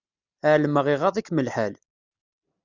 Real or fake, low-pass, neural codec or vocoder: real; 7.2 kHz; none